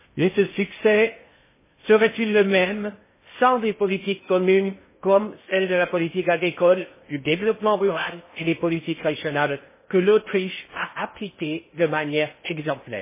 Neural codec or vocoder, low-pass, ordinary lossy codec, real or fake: codec, 16 kHz in and 24 kHz out, 0.6 kbps, FocalCodec, streaming, 2048 codes; 3.6 kHz; MP3, 16 kbps; fake